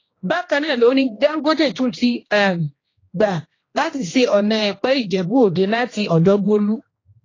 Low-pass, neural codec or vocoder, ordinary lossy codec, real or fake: 7.2 kHz; codec, 16 kHz, 1 kbps, X-Codec, HuBERT features, trained on general audio; AAC, 32 kbps; fake